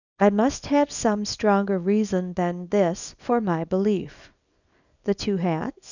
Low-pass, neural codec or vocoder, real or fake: 7.2 kHz; codec, 24 kHz, 0.9 kbps, WavTokenizer, small release; fake